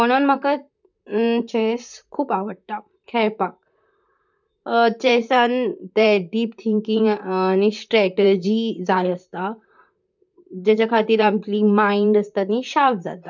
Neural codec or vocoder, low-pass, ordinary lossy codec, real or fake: vocoder, 44.1 kHz, 128 mel bands, Pupu-Vocoder; 7.2 kHz; none; fake